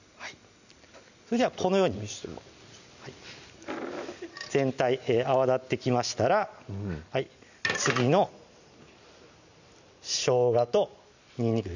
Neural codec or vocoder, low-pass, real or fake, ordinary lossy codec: none; 7.2 kHz; real; none